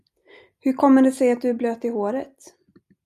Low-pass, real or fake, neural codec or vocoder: 14.4 kHz; real; none